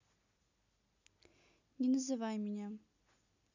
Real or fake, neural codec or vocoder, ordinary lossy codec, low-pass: real; none; none; 7.2 kHz